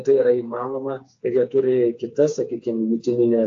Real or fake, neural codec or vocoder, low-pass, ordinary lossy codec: fake; codec, 16 kHz, 4 kbps, FreqCodec, smaller model; 7.2 kHz; AAC, 48 kbps